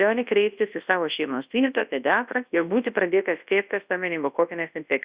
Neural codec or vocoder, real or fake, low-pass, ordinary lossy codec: codec, 24 kHz, 0.9 kbps, WavTokenizer, large speech release; fake; 3.6 kHz; Opus, 64 kbps